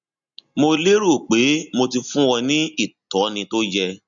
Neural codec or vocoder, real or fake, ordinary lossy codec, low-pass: none; real; none; 7.2 kHz